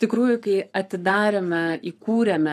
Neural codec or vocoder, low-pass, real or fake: codec, 44.1 kHz, 7.8 kbps, Pupu-Codec; 14.4 kHz; fake